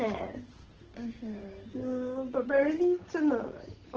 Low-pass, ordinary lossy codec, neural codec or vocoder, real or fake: 7.2 kHz; Opus, 16 kbps; codec, 16 kHz, 8 kbps, FunCodec, trained on Chinese and English, 25 frames a second; fake